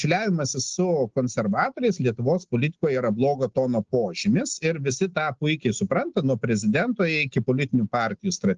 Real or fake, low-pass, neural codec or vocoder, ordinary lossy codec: real; 7.2 kHz; none; Opus, 16 kbps